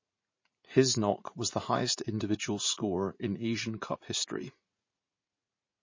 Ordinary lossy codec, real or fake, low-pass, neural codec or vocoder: MP3, 32 kbps; fake; 7.2 kHz; vocoder, 44.1 kHz, 80 mel bands, Vocos